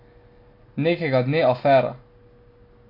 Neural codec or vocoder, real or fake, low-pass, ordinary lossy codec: none; real; 5.4 kHz; MP3, 32 kbps